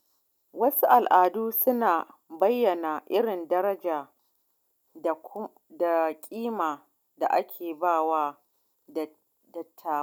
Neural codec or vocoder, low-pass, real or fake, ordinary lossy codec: none; none; real; none